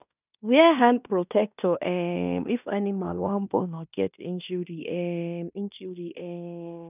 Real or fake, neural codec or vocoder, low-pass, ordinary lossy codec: fake; codec, 16 kHz in and 24 kHz out, 0.9 kbps, LongCat-Audio-Codec, fine tuned four codebook decoder; 3.6 kHz; none